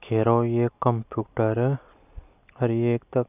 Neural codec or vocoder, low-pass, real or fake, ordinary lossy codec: none; 3.6 kHz; real; none